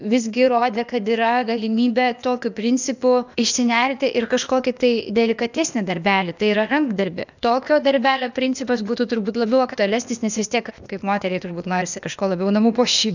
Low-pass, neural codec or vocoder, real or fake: 7.2 kHz; codec, 16 kHz, 0.8 kbps, ZipCodec; fake